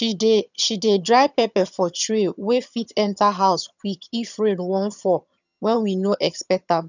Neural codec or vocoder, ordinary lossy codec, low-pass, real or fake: vocoder, 22.05 kHz, 80 mel bands, HiFi-GAN; none; 7.2 kHz; fake